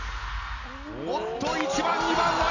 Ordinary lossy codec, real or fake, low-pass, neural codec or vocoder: none; real; 7.2 kHz; none